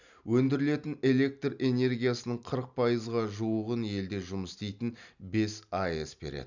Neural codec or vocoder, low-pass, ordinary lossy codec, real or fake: none; 7.2 kHz; none; real